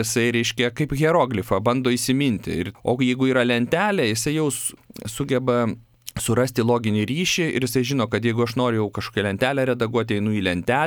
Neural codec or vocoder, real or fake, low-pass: none; real; 19.8 kHz